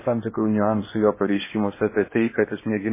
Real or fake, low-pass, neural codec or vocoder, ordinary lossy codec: fake; 3.6 kHz; codec, 16 kHz in and 24 kHz out, 0.8 kbps, FocalCodec, streaming, 65536 codes; MP3, 16 kbps